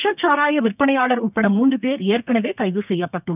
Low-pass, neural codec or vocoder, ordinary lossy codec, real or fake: 3.6 kHz; codec, 32 kHz, 1.9 kbps, SNAC; none; fake